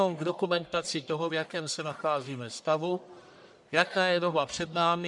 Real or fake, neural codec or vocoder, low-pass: fake; codec, 44.1 kHz, 1.7 kbps, Pupu-Codec; 10.8 kHz